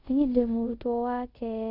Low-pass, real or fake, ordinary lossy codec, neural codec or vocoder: 5.4 kHz; fake; Opus, 64 kbps; codec, 24 kHz, 0.5 kbps, DualCodec